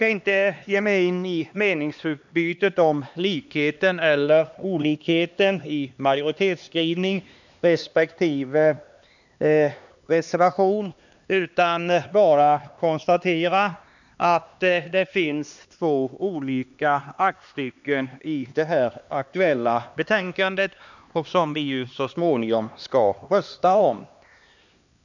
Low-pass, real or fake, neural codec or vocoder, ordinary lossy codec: 7.2 kHz; fake; codec, 16 kHz, 2 kbps, X-Codec, HuBERT features, trained on LibriSpeech; none